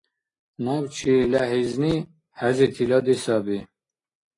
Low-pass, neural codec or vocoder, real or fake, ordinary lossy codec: 10.8 kHz; none; real; AAC, 32 kbps